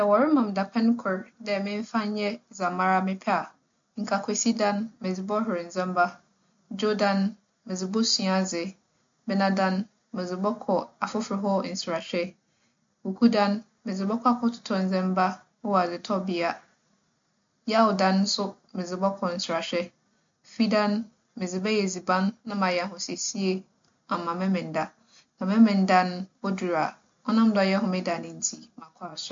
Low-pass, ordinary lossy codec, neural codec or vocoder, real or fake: 7.2 kHz; MP3, 48 kbps; none; real